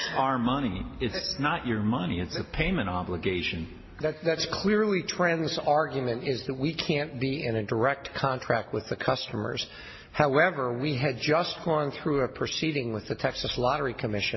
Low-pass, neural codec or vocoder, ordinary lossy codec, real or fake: 7.2 kHz; none; MP3, 24 kbps; real